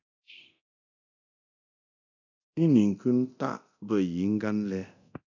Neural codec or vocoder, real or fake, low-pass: codec, 24 kHz, 0.9 kbps, DualCodec; fake; 7.2 kHz